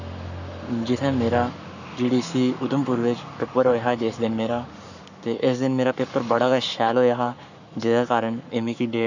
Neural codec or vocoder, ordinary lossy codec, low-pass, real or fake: codec, 44.1 kHz, 7.8 kbps, Pupu-Codec; none; 7.2 kHz; fake